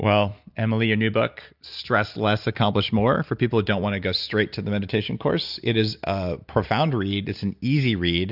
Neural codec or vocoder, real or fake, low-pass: none; real; 5.4 kHz